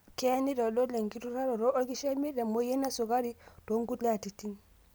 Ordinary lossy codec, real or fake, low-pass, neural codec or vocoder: none; real; none; none